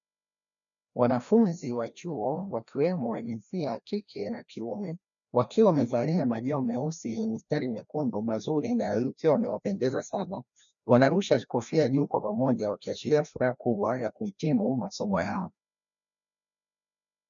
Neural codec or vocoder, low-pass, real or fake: codec, 16 kHz, 1 kbps, FreqCodec, larger model; 7.2 kHz; fake